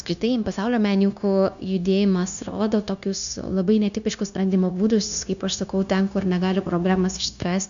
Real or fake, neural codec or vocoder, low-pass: fake; codec, 16 kHz, 0.9 kbps, LongCat-Audio-Codec; 7.2 kHz